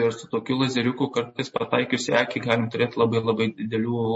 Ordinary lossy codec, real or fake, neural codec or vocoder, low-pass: MP3, 32 kbps; real; none; 10.8 kHz